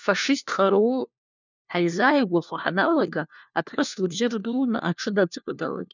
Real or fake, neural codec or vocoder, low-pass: fake; codec, 16 kHz, 1 kbps, FunCodec, trained on LibriTTS, 50 frames a second; 7.2 kHz